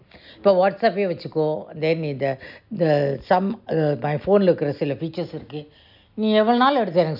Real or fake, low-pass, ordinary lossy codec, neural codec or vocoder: real; 5.4 kHz; none; none